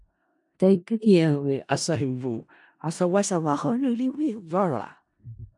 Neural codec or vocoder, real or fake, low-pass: codec, 16 kHz in and 24 kHz out, 0.4 kbps, LongCat-Audio-Codec, four codebook decoder; fake; 10.8 kHz